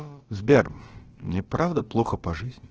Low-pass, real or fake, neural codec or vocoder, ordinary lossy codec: 7.2 kHz; fake; codec, 16 kHz, about 1 kbps, DyCAST, with the encoder's durations; Opus, 16 kbps